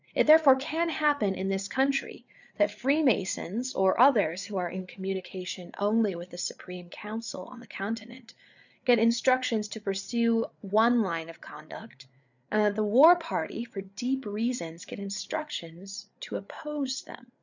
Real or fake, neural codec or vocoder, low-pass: fake; codec, 16 kHz, 8 kbps, FreqCodec, larger model; 7.2 kHz